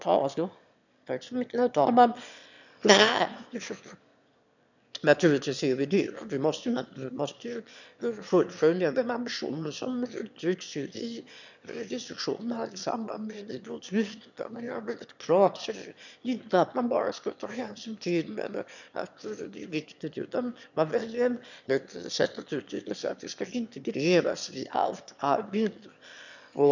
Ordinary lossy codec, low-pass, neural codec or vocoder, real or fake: none; 7.2 kHz; autoencoder, 22.05 kHz, a latent of 192 numbers a frame, VITS, trained on one speaker; fake